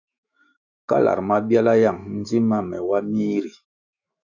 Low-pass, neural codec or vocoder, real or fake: 7.2 kHz; autoencoder, 48 kHz, 128 numbers a frame, DAC-VAE, trained on Japanese speech; fake